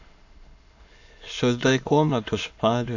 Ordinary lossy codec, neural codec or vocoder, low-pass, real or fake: AAC, 48 kbps; autoencoder, 22.05 kHz, a latent of 192 numbers a frame, VITS, trained on many speakers; 7.2 kHz; fake